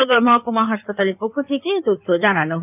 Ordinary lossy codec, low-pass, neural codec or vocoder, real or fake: none; 3.6 kHz; codec, 16 kHz in and 24 kHz out, 2.2 kbps, FireRedTTS-2 codec; fake